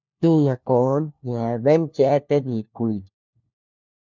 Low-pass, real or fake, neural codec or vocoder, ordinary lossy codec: 7.2 kHz; fake; codec, 16 kHz, 1 kbps, FunCodec, trained on LibriTTS, 50 frames a second; MP3, 64 kbps